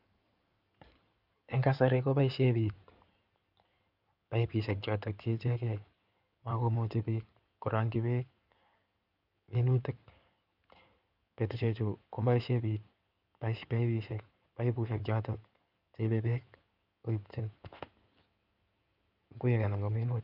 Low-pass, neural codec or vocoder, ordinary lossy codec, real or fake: 5.4 kHz; codec, 16 kHz in and 24 kHz out, 2.2 kbps, FireRedTTS-2 codec; none; fake